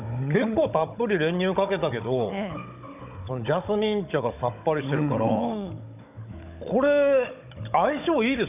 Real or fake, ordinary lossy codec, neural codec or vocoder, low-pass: fake; none; codec, 16 kHz, 16 kbps, FunCodec, trained on Chinese and English, 50 frames a second; 3.6 kHz